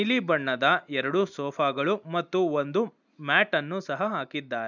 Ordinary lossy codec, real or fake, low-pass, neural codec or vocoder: none; real; 7.2 kHz; none